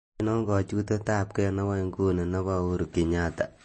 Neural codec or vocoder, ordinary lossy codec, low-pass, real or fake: none; MP3, 32 kbps; 9.9 kHz; real